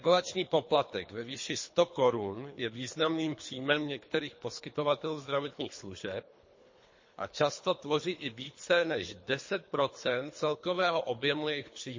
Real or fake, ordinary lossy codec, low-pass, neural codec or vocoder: fake; MP3, 32 kbps; 7.2 kHz; codec, 24 kHz, 3 kbps, HILCodec